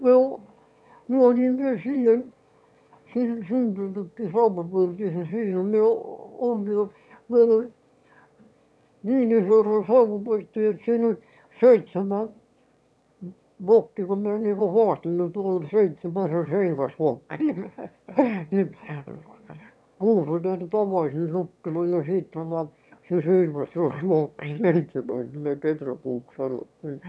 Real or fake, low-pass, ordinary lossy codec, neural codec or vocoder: fake; none; none; autoencoder, 22.05 kHz, a latent of 192 numbers a frame, VITS, trained on one speaker